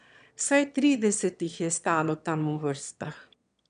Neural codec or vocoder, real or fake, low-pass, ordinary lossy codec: autoencoder, 22.05 kHz, a latent of 192 numbers a frame, VITS, trained on one speaker; fake; 9.9 kHz; none